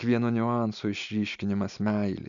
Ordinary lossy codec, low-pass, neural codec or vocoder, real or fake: MP3, 96 kbps; 7.2 kHz; none; real